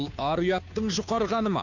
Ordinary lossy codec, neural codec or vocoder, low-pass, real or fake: none; codec, 16 kHz, 2 kbps, FunCodec, trained on Chinese and English, 25 frames a second; 7.2 kHz; fake